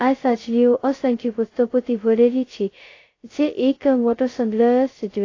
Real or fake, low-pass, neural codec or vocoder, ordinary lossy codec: fake; 7.2 kHz; codec, 16 kHz, 0.2 kbps, FocalCodec; AAC, 32 kbps